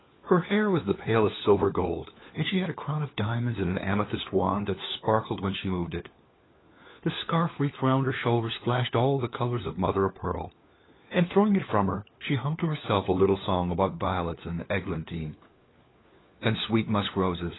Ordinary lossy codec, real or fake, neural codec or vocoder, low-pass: AAC, 16 kbps; fake; codec, 16 kHz, 4 kbps, FunCodec, trained on LibriTTS, 50 frames a second; 7.2 kHz